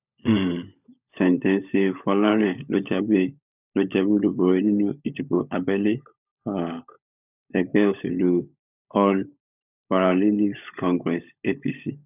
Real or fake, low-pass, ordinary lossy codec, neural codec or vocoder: fake; 3.6 kHz; none; codec, 16 kHz, 16 kbps, FunCodec, trained on LibriTTS, 50 frames a second